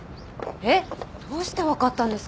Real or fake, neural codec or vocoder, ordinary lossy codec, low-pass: real; none; none; none